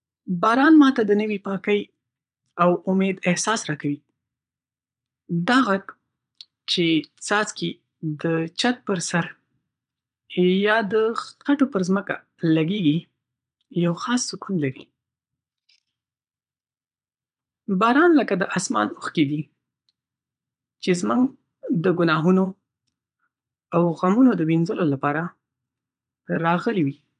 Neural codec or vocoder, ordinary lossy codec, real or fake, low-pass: vocoder, 22.05 kHz, 80 mel bands, Vocos; none; fake; 9.9 kHz